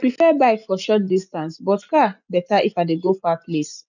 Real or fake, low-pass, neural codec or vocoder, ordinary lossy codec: real; 7.2 kHz; none; none